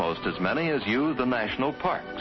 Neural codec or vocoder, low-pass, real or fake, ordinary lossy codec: none; 7.2 kHz; real; MP3, 24 kbps